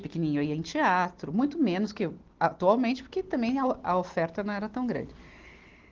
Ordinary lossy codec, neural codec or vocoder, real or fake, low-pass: Opus, 16 kbps; none; real; 7.2 kHz